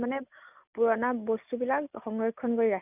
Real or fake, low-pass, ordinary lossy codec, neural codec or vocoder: real; 3.6 kHz; none; none